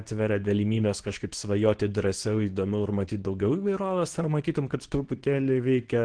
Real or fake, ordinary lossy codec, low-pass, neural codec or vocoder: fake; Opus, 16 kbps; 9.9 kHz; codec, 24 kHz, 0.9 kbps, WavTokenizer, medium speech release version 2